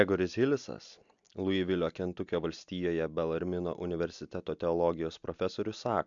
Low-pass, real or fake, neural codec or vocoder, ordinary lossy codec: 7.2 kHz; real; none; MP3, 64 kbps